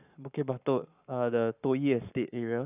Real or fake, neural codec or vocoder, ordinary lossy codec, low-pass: fake; codec, 44.1 kHz, 7.8 kbps, Pupu-Codec; none; 3.6 kHz